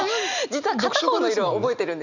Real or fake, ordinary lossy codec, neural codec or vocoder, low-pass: real; none; none; 7.2 kHz